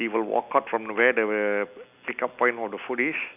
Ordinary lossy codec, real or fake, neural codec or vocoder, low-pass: none; real; none; 3.6 kHz